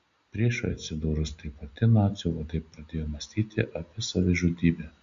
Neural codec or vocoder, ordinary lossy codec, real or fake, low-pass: none; Opus, 64 kbps; real; 7.2 kHz